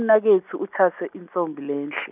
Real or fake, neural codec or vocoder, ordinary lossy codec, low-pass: real; none; none; 3.6 kHz